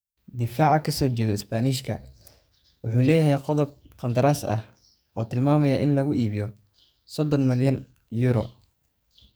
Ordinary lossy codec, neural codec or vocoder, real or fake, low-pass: none; codec, 44.1 kHz, 2.6 kbps, SNAC; fake; none